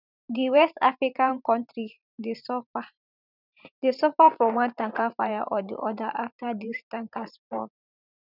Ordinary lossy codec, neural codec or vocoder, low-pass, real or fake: none; vocoder, 44.1 kHz, 128 mel bands every 512 samples, BigVGAN v2; 5.4 kHz; fake